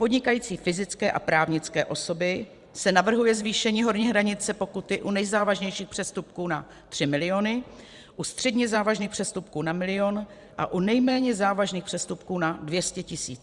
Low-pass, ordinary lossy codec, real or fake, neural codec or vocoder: 10.8 kHz; Opus, 64 kbps; fake; vocoder, 44.1 kHz, 128 mel bands every 512 samples, BigVGAN v2